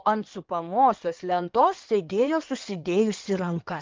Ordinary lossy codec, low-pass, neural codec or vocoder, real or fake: Opus, 32 kbps; 7.2 kHz; codec, 16 kHz in and 24 kHz out, 2.2 kbps, FireRedTTS-2 codec; fake